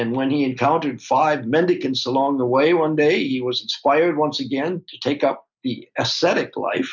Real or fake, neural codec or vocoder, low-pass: real; none; 7.2 kHz